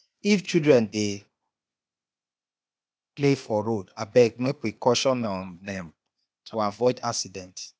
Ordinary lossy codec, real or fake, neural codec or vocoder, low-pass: none; fake; codec, 16 kHz, 0.8 kbps, ZipCodec; none